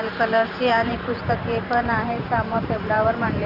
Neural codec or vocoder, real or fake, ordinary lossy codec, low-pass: vocoder, 44.1 kHz, 128 mel bands every 512 samples, BigVGAN v2; fake; none; 5.4 kHz